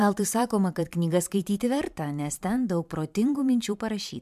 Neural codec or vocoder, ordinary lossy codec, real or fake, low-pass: vocoder, 44.1 kHz, 128 mel bands every 512 samples, BigVGAN v2; MP3, 96 kbps; fake; 14.4 kHz